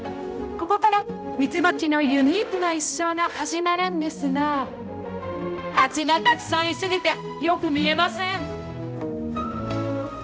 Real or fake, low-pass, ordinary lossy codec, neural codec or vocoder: fake; none; none; codec, 16 kHz, 0.5 kbps, X-Codec, HuBERT features, trained on balanced general audio